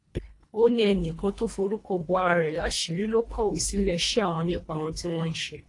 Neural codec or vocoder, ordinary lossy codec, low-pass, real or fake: codec, 24 kHz, 1.5 kbps, HILCodec; AAC, 48 kbps; 10.8 kHz; fake